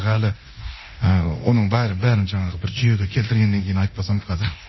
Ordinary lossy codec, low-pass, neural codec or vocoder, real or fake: MP3, 24 kbps; 7.2 kHz; codec, 24 kHz, 0.9 kbps, DualCodec; fake